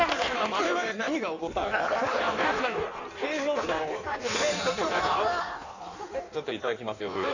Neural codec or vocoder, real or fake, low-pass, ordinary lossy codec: codec, 16 kHz in and 24 kHz out, 1.1 kbps, FireRedTTS-2 codec; fake; 7.2 kHz; none